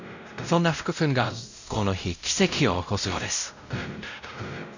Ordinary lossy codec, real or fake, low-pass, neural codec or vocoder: none; fake; 7.2 kHz; codec, 16 kHz, 0.5 kbps, X-Codec, WavLM features, trained on Multilingual LibriSpeech